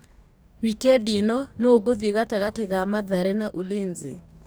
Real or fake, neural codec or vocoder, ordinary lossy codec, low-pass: fake; codec, 44.1 kHz, 2.6 kbps, DAC; none; none